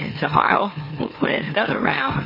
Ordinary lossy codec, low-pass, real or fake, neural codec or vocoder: MP3, 24 kbps; 5.4 kHz; fake; autoencoder, 44.1 kHz, a latent of 192 numbers a frame, MeloTTS